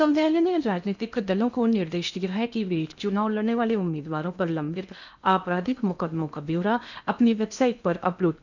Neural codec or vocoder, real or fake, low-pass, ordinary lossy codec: codec, 16 kHz in and 24 kHz out, 0.8 kbps, FocalCodec, streaming, 65536 codes; fake; 7.2 kHz; none